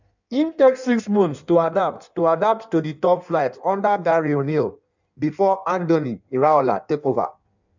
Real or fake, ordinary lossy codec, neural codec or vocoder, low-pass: fake; none; codec, 16 kHz in and 24 kHz out, 1.1 kbps, FireRedTTS-2 codec; 7.2 kHz